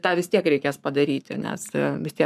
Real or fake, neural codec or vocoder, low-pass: fake; codec, 44.1 kHz, 7.8 kbps, Pupu-Codec; 14.4 kHz